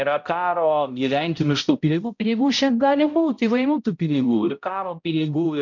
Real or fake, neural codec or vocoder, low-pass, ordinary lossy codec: fake; codec, 16 kHz, 0.5 kbps, X-Codec, HuBERT features, trained on balanced general audio; 7.2 kHz; MP3, 64 kbps